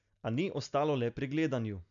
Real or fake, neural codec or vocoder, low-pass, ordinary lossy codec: real; none; 7.2 kHz; none